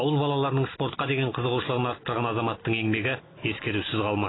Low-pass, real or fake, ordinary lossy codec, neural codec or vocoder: 7.2 kHz; real; AAC, 16 kbps; none